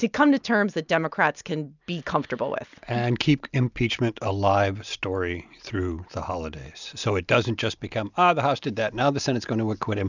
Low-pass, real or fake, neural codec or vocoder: 7.2 kHz; real; none